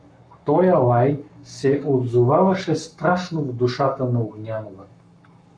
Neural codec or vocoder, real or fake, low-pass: codec, 44.1 kHz, 7.8 kbps, Pupu-Codec; fake; 9.9 kHz